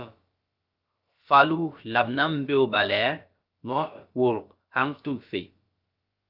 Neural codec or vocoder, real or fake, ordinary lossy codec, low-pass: codec, 16 kHz, about 1 kbps, DyCAST, with the encoder's durations; fake; Opus, 24 kbps; 5.4 kHz